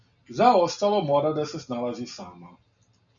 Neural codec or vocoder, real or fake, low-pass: none; real; 7.2 kHz